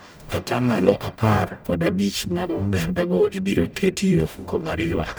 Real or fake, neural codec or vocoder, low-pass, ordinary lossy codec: fake; codec, 44.1 kHz, 0.9 kbps, DAC; none; none